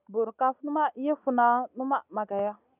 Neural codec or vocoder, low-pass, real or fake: none; 3.6 kHz; real